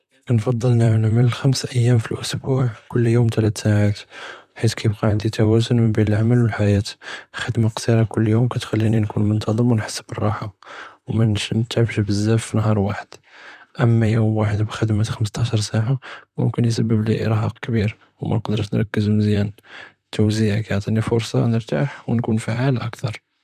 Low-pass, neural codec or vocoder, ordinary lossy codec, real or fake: 14.4 kHz; vocoder, 44.1 kHz, 128 mel bands, Pupu-Vocoder; none; fake